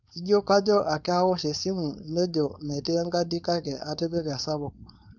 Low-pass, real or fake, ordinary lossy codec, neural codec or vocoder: 7.2 kHz; fake; none; codec, 16 kHz, 4.8 kbps, FACodec